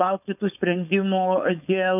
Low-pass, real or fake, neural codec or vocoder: 3.6 kHz; fake; codec, 16 kHz, 4.8 kbps, FACodec